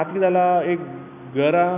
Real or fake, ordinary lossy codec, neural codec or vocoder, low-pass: real; none; none; 3.6 kHz